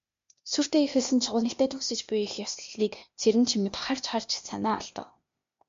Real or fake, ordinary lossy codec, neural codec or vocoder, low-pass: fake; MP3, 48 kbps; codec, 16 kHz, 0.8 kbps, ZipCodec; 7.2 kHz